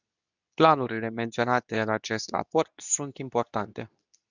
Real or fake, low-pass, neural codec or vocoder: fake; 7.2 kHz; codec, 24 kHz, 0.9 kbps, WavTokenizer, medium speech release version 2